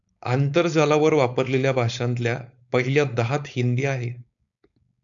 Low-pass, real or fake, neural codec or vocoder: 7.2 kHz; fake; codec, 16 kHz, 4.8 kbps, FACodec